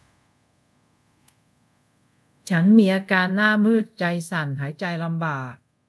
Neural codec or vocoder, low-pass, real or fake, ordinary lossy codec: codec, 24 kHz, 0.5 kbps, DualCodec; none; fake; none